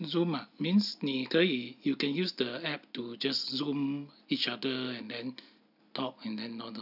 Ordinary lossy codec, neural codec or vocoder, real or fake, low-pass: none; none; real; 5.4 kHz